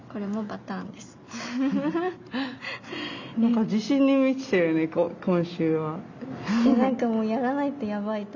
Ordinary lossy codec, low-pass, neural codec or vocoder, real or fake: none; 7.2 kHz; none; real